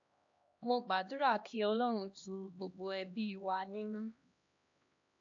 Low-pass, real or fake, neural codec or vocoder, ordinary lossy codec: 7.2 kHz; fake; codec, 16 kHz, 1 kbps, X-Codec, HuBERT features, trained on LibriSpeech; AAC, 48 kbps